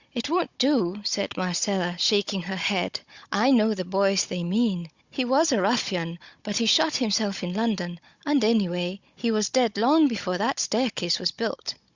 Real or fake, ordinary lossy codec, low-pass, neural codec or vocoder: fake; Opus, 64 kbps; 7.2 kHz; codec, 16 kHz, 16 kbps, FunCodec, trained on Chinese and English, 50 frames a second